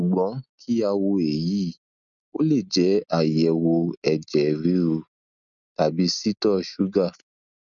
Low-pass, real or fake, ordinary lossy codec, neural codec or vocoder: 7.2 kHz; real; MP3, 96 kbps; none